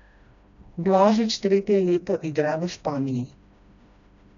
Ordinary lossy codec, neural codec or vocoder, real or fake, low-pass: none; codec, 16 kHz, 1 kbps, FreqCodec, smaller model; fake; 7.2 kHz